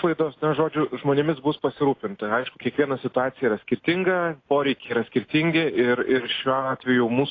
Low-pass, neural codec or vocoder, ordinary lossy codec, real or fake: 7.2 kHz; none; AAC, 32 kbps; real